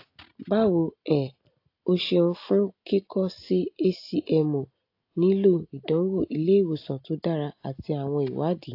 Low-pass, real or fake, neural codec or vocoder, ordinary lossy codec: 5.4 kHz; real; none; AAC, 32 kbps